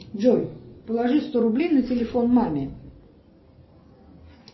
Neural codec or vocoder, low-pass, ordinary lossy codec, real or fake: none; 7.2 kHz; MP3, 24 kbps; real